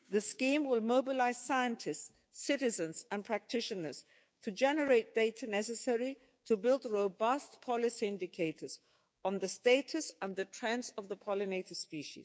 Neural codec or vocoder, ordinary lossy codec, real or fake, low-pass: codec, 16 kHz, 6 kbps, DAC; none; fake; none